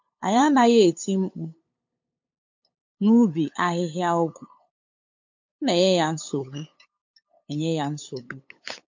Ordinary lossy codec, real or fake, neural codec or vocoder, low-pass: MP3, 48 kbps; fake; codec, 16 kHz, 8 kbps, FunCodec, trained on LibriTTS, 25 frames a second; 7.2 kHz